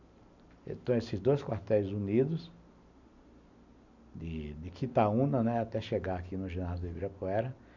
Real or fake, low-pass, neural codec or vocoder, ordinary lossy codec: real; 7.2 kHz; none; none